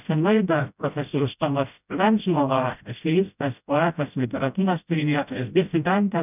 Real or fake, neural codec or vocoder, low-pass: fake; codec, 16 kHz, 0.5 kbps, FreqCodec, smaller model; 3.6 kHz